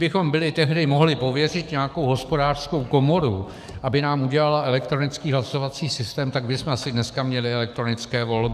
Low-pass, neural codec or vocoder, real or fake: 14.4 kHz; codec, 44.1 kHz, 7.8 kbps, DAC; fake